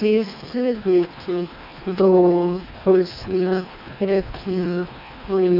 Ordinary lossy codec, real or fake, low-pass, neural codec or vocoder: none; fake; 5.4 kHz; codec, 24 kHz, 1.5 kbps, HILCodec